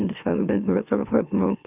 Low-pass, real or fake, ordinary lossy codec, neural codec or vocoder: 3.6 kHz; fake; none; autoencoder, 44.1 kHz, a latent of 192 numbers a frame, MeloTTS